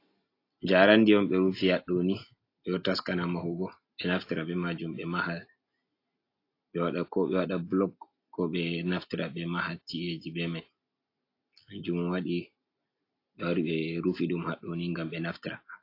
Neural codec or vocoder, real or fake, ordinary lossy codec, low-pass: none; real; AAC, 32 kbps; 5.4 kHz